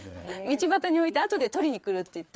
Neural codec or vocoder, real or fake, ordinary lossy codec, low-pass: codec, 16 kHz, 4 kbps, FreqCodec, larger model; fake; none; none